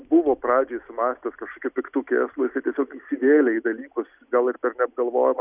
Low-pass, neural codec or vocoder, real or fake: 3.6 kHz; none; real